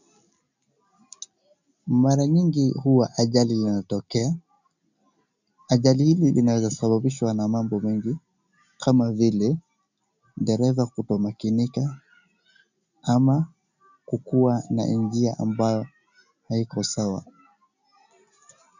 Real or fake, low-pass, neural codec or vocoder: real; 7.2 kHz; none